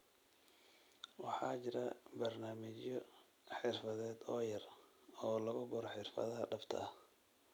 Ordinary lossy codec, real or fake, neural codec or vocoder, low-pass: none; fake; vocoder, 44.1 kHz, 128 mel bands every 256 samples, BigVGAN v2; none